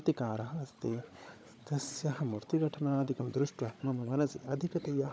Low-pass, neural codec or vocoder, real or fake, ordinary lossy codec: none; codec, 16 kHz, 16 kbps, FunCodec, trained on Chinese and English, 50 frames a second; fake; none